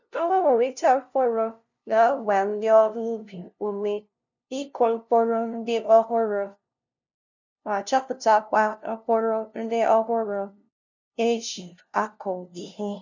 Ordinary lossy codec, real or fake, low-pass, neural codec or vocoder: none; fake; 7.2 kHz; codec, 16 kHz, 0.5 kbps, FunCodec, trained on LibriTTS, 25 frames a second